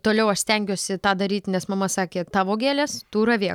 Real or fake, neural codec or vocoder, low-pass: real; none; 19.8 kHz